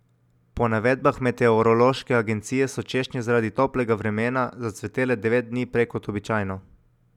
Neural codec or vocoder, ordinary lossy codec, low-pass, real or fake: none; none; 19.8 kHz; real